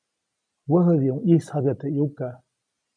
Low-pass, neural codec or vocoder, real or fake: 9.9 kHz; none; real